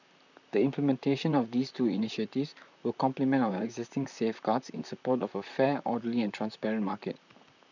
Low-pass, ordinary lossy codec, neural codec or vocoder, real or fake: 7.2 kHz; none; vocoder, 44.1 kHz, 128 mel bands, Pupu-Vocoder; fake